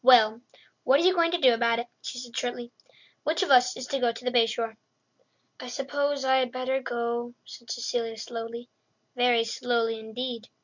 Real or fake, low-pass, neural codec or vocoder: real; 7.2 kHz; none